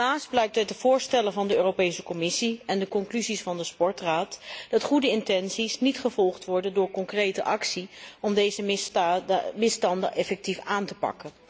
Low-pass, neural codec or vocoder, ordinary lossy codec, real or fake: none; none; none; real